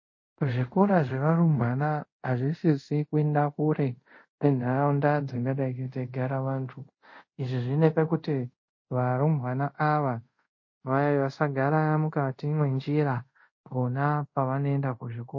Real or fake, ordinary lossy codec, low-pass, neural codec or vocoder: fake; MP3, 32 kbps; 7.2 kHz; codec, 24 kHz, 0.5 kbps, DualCodec